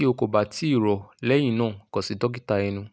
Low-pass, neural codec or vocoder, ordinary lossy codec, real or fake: none; none; none; real